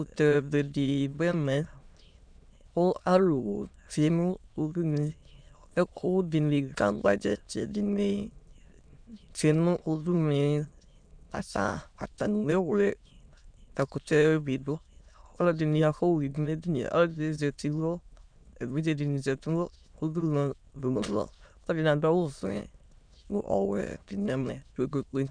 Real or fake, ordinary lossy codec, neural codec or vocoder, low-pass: fake; MP3, 96 kbps; autoencoder, 22.05 kHz, a latent of 192 numbers a frame, VITS, trained on many speakers; 9.9 kHz